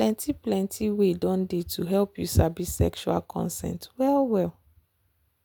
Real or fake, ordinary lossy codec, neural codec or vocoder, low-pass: real; none; none; none